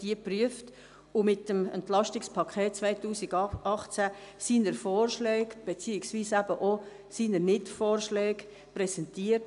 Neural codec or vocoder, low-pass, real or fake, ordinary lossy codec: none; 10.8 kHz; real; none